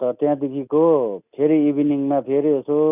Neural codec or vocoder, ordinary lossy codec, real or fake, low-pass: none; none; real; 3.6 kHz